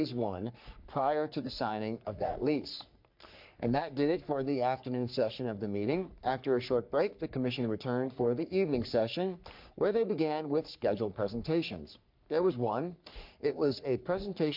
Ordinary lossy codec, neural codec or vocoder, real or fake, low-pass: MP3, 48 kbps; codec, 44.1 kHz, 3.4 kbps, Pupu-Codec; fake; 5.4 kHz